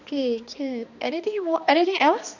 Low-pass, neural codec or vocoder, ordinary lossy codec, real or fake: 7.2 kHz; codec, 16 kHz, 2 kbps, X-Codec, HuBERT features, trained on balanced general audio; none; fake